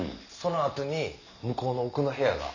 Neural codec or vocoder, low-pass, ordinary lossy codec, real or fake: none; 7.2 kHz; none; real